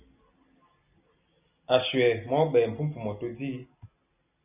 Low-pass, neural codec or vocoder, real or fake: 3.6 kHz; none; real